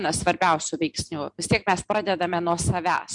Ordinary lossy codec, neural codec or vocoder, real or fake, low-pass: MP3, 64 kbps; none; real; 10.8 kHz